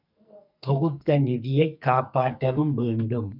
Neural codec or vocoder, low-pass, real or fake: codec, 44.1 kHz, 2.6 kbps, SNAC; 5.4 kHz; fake